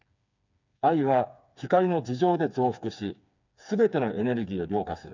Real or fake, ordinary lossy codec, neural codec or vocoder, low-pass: fake; none; codec, 16 kHz, 4 kbps, FreqCodec, smaller model; 7.2 kHz